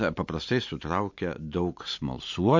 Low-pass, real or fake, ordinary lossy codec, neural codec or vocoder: 7.2 kHz; real; MP3, 48 kbps; none